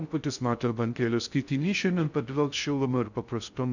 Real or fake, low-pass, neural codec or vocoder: fake; 7.2 kHz; codec, 16 kHz in and 24 kHz out, 0.6 kbps, FocalCodec, streaming, 2048 codes